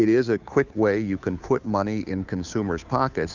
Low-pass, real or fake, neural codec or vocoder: 7.2 kHz; fake; codec, 16 kHz, 2 kbps, FunCodec, trained on Chinese and English, 25 frames a second